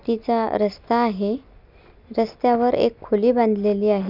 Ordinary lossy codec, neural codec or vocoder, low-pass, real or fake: none; none; 5.4 kHz; real